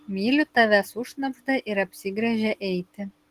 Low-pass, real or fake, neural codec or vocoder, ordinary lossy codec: 14.4 kHz; real; none; Opus, 16 kbps